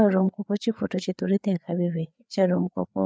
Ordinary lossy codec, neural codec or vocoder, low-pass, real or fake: none; codec, 16 kHz, 4 kbps, FreqCodec, larger model; none; fake